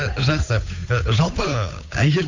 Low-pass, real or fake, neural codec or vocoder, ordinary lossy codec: 7.2 kHz; fake; codec, 16 kHz, 8 kbps, FunCodec, trained on Chinese and English, 25 frames a second; AAC, 48 kbps